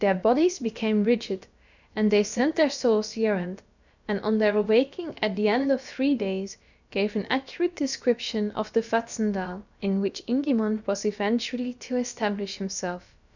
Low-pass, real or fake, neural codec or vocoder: 7.2 kHz; fake; codec, 16 kHz, about 1 kbps, DyCAST, with the encoder's durations